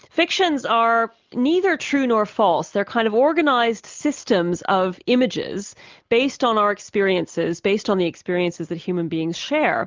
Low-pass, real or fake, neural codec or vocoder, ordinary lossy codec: 7.2 kHz; real; none; Opus, 32 kbps